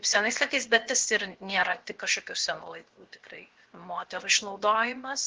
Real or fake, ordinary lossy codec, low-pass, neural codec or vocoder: fake; Opus, 16 kbps; 7.2 kHz; codec, 16 kHz, 0.7 kbps, FocalCodec